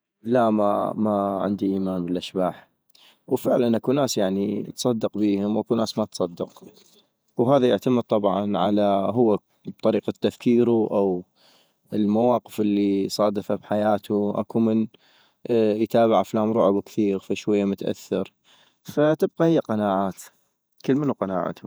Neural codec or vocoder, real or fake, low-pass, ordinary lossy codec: vocoder, 48 kHz, 128 mel bands, Vocos; fake; none; none